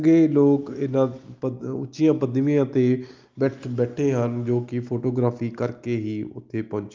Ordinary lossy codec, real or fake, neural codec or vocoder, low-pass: Opus, 24 kbps; real; none; 7.2 kHz